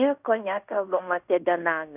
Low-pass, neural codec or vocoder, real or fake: 3.6 kHz; codec, 16 kHz in and 24 kHz out, 0.9 kbps, LongCat-Audio-Codec, fine tuned four codebook decoder; fake